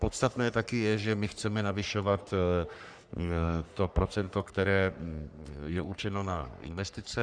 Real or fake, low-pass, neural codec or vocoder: fake; 9.9 kHz; codec, 44.1 kHz, 3.4 kbps, Pupu-Codec